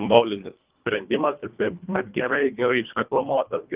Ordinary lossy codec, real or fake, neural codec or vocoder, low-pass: Opus, 32 kbps; fake; codec, 24 kHz, 1.5 kbps, HILCodec; 3.6 kHz